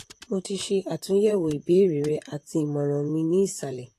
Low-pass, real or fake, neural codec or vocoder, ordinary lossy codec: 14.4 kHz; fake; vocoder, 44.1 kHz, 128 mel bands, Pupu-Vocoder; AAC, 48 kbps